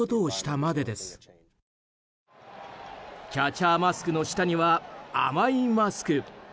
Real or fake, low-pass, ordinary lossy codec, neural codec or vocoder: real; none; none; none